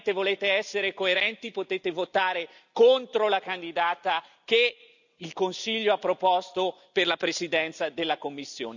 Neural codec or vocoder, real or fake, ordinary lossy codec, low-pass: none; real; none; 7.2 kHz